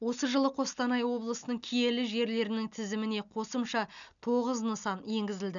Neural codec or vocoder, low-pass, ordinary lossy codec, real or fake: none; 7.2 kHz; none; real